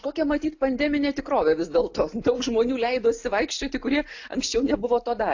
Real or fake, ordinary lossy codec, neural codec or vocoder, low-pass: real; AAC, 48 kbps; none; 7.2 kHz